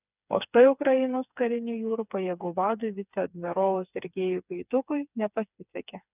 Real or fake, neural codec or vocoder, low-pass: fake; codec, 16 kHz, 4 kbps, FreqCodec, smaller model; 3.6 kHz